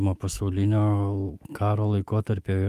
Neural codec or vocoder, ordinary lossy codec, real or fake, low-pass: autoencoder, 48 kHz, 128 numbers a frame, DAC-VAE, trained on Japanese speech; Opus, 32 kbps; fake; 14.4 kHz